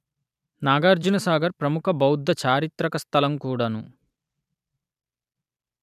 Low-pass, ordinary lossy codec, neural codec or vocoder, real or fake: 14.4 kHz; none; vocoder, 44.1 kHz, 128 mel bands every 256 samples, BigVGAN v2; fake